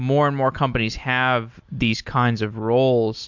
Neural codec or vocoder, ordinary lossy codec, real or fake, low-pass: none; MP3, 64 kbps; real; 7.2 kHz